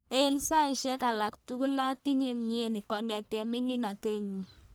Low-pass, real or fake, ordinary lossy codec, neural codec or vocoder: none; fake; none; codec, 44.1 kHz, 1.7 kbps, Pupu-Codec